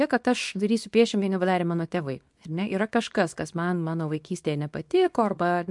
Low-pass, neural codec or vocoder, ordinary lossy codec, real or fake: 10.8 kHz; codec, 24 kHz, 0.9 kbps, WavTokenizer, small release; MP3, 64 kbps; fake